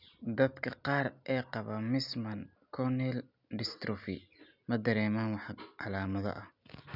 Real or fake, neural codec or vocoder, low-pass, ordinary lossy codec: real; none; 5.4 kHz; none